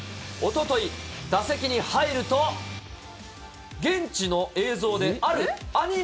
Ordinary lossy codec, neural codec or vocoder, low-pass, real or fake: none; none; none; real